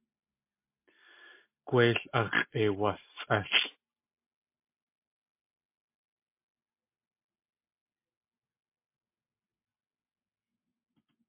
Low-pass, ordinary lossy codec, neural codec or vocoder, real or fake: 3.6 kHz; MP3, 24 kbps; none; real